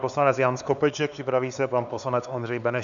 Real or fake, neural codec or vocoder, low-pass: fake; codec, 16 kHz, 4 kbps, X-Codec, HuBERT features, trained on LibriSpeech; 7.2 kHz